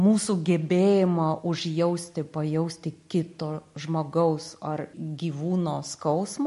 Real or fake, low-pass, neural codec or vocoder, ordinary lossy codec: real; 14.4 kHz; none; MP3, 48 kbps